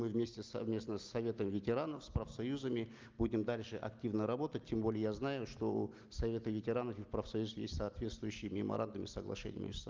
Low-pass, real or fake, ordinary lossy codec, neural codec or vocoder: 7.2 kHz; real; Opus, 32 kbps; none